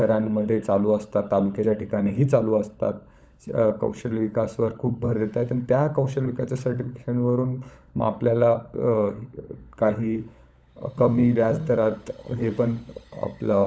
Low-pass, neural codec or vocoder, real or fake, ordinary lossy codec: none; codec, 16 kHz, 16 kbps, FunCodec, trained on LibriTTS, 50 frames a second; fake; none